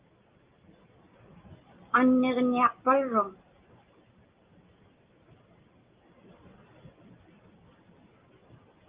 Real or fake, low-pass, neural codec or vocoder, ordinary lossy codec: real; 3.6 kHz; none; Opus, 32 kbps